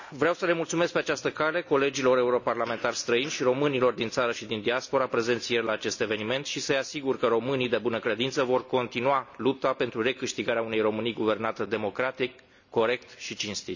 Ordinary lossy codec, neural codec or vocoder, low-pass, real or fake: none; none; 7.2 kHz; real